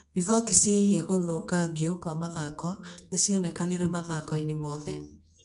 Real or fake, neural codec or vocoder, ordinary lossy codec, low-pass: fake; codec, 24 kHz, 0.9 kbps, WavTokenizer, medium music audio release; none; 10.8 kHz